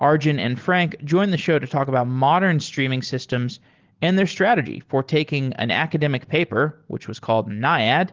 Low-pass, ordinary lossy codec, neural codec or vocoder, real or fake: 7.2 kHz; Opus, 16 kbps; none; real